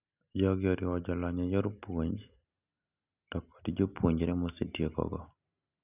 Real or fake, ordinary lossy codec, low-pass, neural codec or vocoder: real; none; 3.6 kHz; none